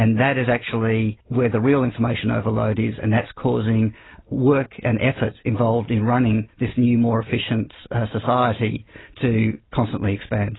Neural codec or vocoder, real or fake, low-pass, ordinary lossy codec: none; real; 7.2 kHz; AAC, 16 kbps